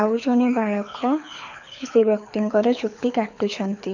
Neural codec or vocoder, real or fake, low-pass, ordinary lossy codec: codec, 24 kHz, 6 kbps, HILCodec; fake; 7.2 kHz; none